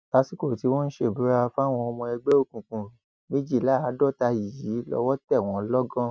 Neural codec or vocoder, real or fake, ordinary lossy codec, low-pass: none; real; none; none